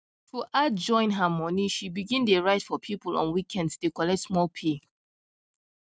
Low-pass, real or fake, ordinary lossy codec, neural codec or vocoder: none; real; none; none